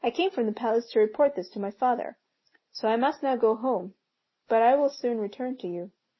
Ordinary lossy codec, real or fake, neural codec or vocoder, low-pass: MP3, 24 kbps; real; none; 7.2 kHz